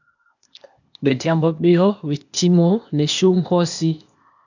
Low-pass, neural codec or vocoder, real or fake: 7.2 kHz; codec, 16 kHz, 0.8 kbps, ZipCodec; fake